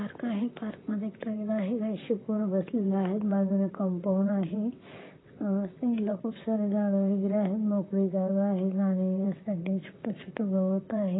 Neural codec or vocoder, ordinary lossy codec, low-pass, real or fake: vocoder, 44.1 kHz, 128 mel bands, Pupu-Vocoder; AAC, 16 kbps; 7.2 kHz; fake